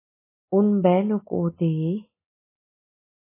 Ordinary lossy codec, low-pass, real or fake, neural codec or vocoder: MP3, 16 kbps; 3.6 kHz; real; none